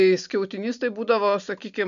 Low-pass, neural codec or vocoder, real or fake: 7.2 kHz; none; real